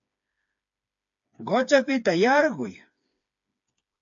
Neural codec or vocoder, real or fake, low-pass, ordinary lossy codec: codec, 16 kHz, 4 kbps, FreqCodec, smaller model; fake; 7.2 kHz; AAC, 64 kbps